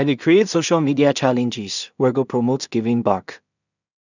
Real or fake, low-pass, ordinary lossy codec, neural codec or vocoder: fake; 7.2 kHz; none; codec, 16 kHz in and 24 kHz out, 0.4 kbps, LongCat-Audio-Codec, two codebook decoder